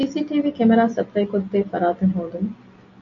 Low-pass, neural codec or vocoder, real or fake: 7.2 kHz; none; real